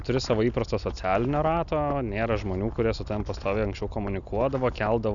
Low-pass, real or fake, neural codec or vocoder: 7.2 kHz; real; none